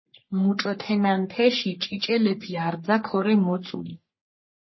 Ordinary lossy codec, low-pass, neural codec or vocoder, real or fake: MP3, 24 kbps; 7.2 kHz; codec, 44.1 kHz, 3.4 kbps, Pupu-Codec; fake